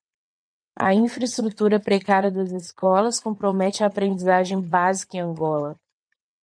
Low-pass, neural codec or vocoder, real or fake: 9.9 kHz; vocoder, 22.05 kHz, 80 mel bands, WaveNeXt; fake